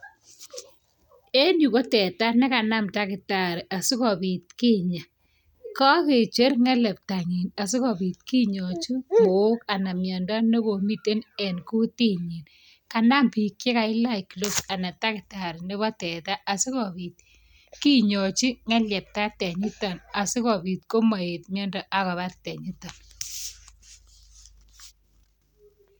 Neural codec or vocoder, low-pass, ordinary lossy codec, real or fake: none; none; none; real